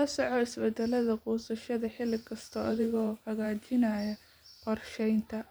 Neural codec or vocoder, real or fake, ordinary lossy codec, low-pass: vocoder, 44.1 kHz, 128 mel bands every 512 samples, BigVGAN v2; fake; none; none